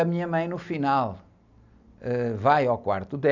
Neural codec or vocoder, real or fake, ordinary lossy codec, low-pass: none; real; none; 7.2 kHz